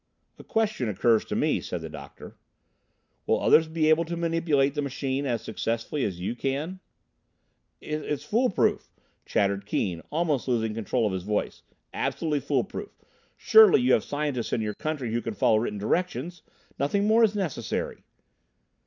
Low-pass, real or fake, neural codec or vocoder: 7.2 kHz; real; none